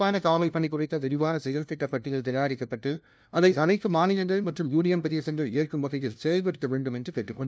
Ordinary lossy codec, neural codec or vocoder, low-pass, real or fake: none; codec, 16 kHz, 0.5 kbps, FunCodec, trained on LibriTTS, 25 frames a second; none; fake